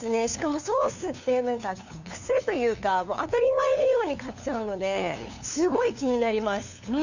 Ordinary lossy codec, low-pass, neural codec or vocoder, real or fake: none; 7.2 kHz; codec, 16 kHz, 2 kbps, FunCodec, trained on LibriTTS, 25 frames a second; fake